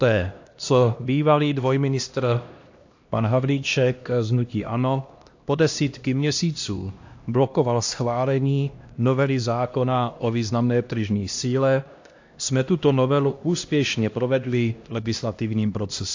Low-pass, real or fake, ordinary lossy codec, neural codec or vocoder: 7.2 kHz; fake; AAC, 48 kbps; codec, 16 kHz, 1 kbps, X-Codec, HuBERT features, trained on LibriSpeech